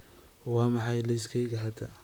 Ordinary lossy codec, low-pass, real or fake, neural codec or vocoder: none; none; fake; vocoder, 44.1 kHz, 128 mel bands, Pupu-Vocoder